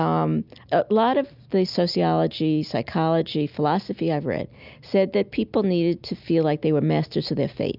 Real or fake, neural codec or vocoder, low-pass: real; none; 5.4 kHz